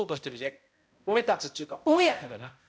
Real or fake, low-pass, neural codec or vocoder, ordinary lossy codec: fake; none; codec, 16 kHz, 0.5 kbps, X-Codec, HuBERT features, trained on balanced general audio; none